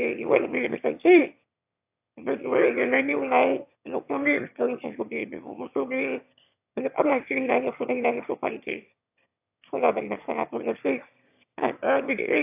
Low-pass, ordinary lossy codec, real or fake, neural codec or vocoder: 3.6 kHz; AAC, 32 kbps; fake; autoencoder, 22.05 kHz, a latent of 192 numbers a frame, VITS, trained on one speaker